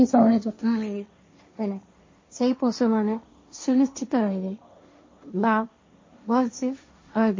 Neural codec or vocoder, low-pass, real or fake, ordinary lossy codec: codec, 16 kHz, 1.1 kbps, Voila-Tokenizer; 7.2 kHz; fake; MP3, 32 kbps